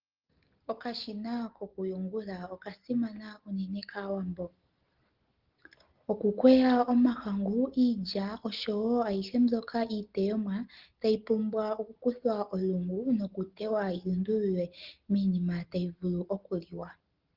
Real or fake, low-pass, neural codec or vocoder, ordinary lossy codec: real; 5.4 kHz; none; Opus, 16 kbps